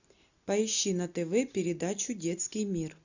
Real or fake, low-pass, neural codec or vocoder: real; 7.2 kHz; none